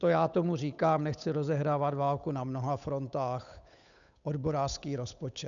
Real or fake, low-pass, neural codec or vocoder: fake; 7.2 kHz; codec, 16 kHz, 8 kbps, FunCodec, trained on Chinese and English, 25 frames a second